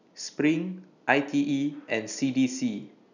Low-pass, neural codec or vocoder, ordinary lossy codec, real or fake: 7.2 kHz; none; none; real